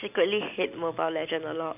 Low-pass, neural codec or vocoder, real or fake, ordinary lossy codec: 3.6 kHz; none; real; none